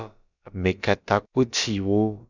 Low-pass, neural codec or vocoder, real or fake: 7.2 kHz; codec, 16 kHz, about 1 kbps, DyCAST, with the encoder's durations; fake